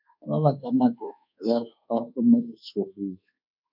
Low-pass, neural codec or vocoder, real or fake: 5.4 kHz; codec, 24 kHz, 1.2 kbps, DualCodec; fake